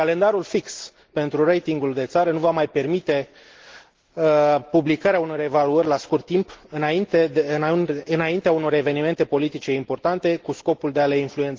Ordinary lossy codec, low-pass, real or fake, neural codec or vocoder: Opus, 16 kbps; 7.2 kHz; real; none